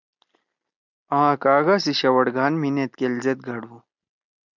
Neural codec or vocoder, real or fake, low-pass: none; real; 7.2 kHz